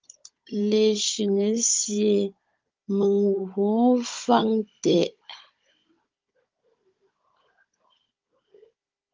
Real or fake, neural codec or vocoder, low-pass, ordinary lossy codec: fake; codec, 16 kHz, 16 kbps, FunCodec, trained on Chinese and English, 50 frames a second; 7.2 kHz; Opus, 32 kbps